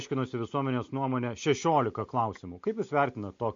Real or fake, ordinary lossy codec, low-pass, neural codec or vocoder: real; MP3, 48 kbps; 7.2 kHz; none